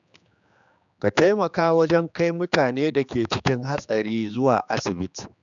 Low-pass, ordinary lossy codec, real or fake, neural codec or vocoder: 7.2 kHz; none; fake; codec, 16 kHz, 2 kbps, X-Codec, HuBERT features, trained on general audio